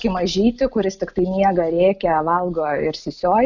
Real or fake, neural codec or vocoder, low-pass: real; none; 7.2 kHz